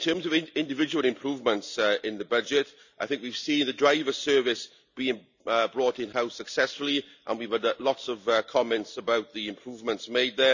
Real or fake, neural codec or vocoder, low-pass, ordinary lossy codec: real; none; 7.2 kHz; none